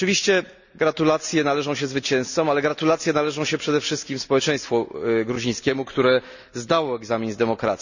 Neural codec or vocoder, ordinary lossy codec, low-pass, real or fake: none; none; 7.2 kHz; real